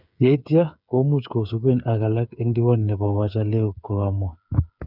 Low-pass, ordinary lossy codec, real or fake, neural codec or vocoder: 5.4 kHz; none; fake; codec, 16 kHz, 8 kbps, FreqCodec, smaller model